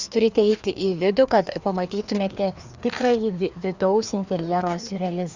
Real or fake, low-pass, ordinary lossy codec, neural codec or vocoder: fake; 7.2 kHz; Opus, 64 kbps; codec, 16 kHz, 2 kbps, FreqCodec, larger model